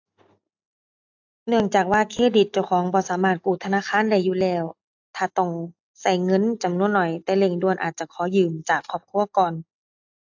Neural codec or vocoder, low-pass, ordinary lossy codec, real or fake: none; 7.2 kHz; AAC, 48 kbps; real